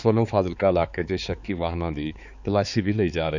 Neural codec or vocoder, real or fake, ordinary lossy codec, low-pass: codec, 16 kHz, 4 kbps, X-Codec, HuBERT features, trained on balanced general audio; fake; none; 7.2 kHz